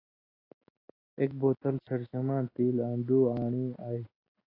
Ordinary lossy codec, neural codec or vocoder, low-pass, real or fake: AAC, 24 kbps; none; 5.4 kHz; real